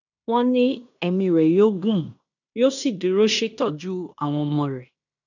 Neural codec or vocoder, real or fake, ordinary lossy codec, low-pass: codec, 16 kHz in and 24 kHz out, 0.9 kbps, LongCat-Audio-Codec, fine tuned four codebook decoder; fake; none; 7.2 kHz